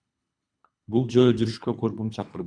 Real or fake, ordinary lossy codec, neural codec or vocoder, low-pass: fake; MP3, 96 kbps; codec, 24 kHz, 3 kbps, HILCodec; 9.9 kHz